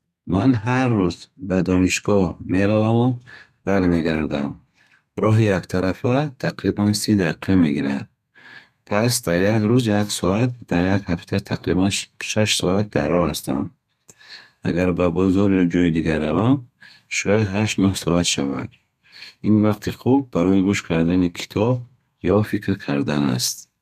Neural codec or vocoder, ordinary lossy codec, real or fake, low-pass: codec, 32 kHz, 1.9 kbps, SNAC; none; fake; 14.4 kHz